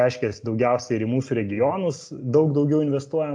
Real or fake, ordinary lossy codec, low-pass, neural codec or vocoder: real; AAC, 64 kbps; 9.9 kHz; none